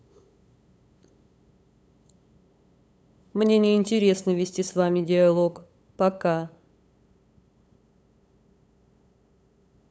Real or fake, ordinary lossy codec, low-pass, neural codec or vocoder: fake; none; none; codec, 16 kHz, 8 kbps, FunCodec, trained on LibriTTS, 25 frames a second